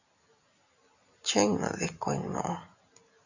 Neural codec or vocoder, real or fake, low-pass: none; real; 7.2 kHz